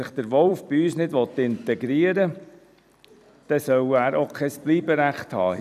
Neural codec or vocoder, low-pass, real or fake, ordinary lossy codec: vocoder, 44.1 kHz, 128 mel bands every 256 samples, BigVGAN v2; 14.4 kHz; fake; none